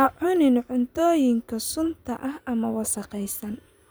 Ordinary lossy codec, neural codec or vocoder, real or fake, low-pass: none; vocoder, 44.1 kHz, 128 mel bands every 512 samples, BigVGAN v2; fake; none